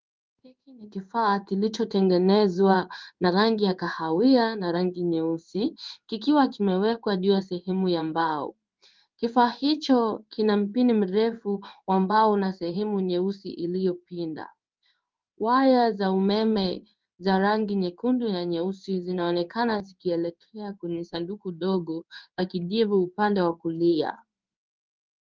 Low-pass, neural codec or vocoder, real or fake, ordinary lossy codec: 7.2 kHz; codec, 16 kHz in and 24 kHz out, 1 kbps, XY-Tokenizer; fake; Opus, 24 kbps